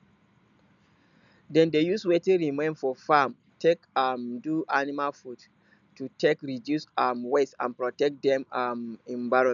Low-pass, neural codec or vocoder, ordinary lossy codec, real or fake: 7.2 kHz; none; none; real